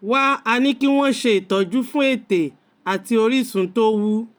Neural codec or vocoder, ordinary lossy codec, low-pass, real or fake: none; none; none; real